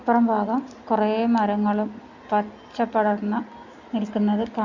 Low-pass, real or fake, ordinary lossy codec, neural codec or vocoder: 7.2 kHz; real; Opus, 64 kbps; none